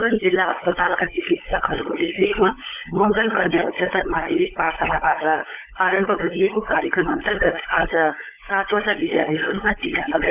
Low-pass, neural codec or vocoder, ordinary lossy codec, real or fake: 3.6 kHz; codec, 16 kHz, 16 kbps, FunCodec, trained on LibriTTS, 50 frames a second; none; fake